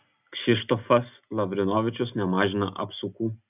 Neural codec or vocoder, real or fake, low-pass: none; real; 3.6 kHz